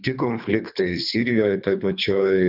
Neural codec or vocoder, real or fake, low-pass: codec, 24 kHz, 3 kbps, HILCodec; fake; 5.4 kHz